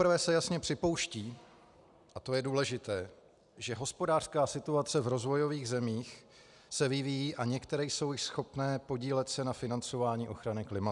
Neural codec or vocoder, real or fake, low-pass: none; real; 10.8 kHz